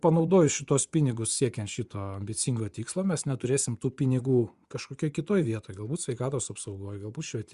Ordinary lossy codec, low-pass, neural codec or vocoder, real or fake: Opus, 64 kbps; 10.8 kHz; vocoder, 24 kHz, 100 mel bands, Vocos; fake